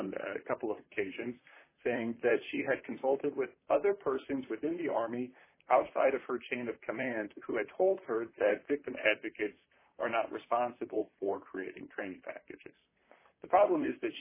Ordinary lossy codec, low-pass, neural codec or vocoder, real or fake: MP3, 16 kbps; 3.6 kHz; vocoder, 44.1 kHz, 128 mel bands, Pupu-Vocoder; fake